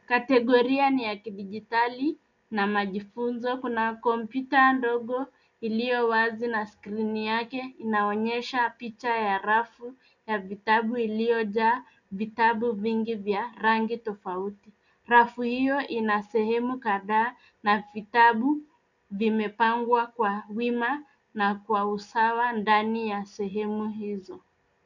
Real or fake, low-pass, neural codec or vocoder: real; 7.2 kHz; none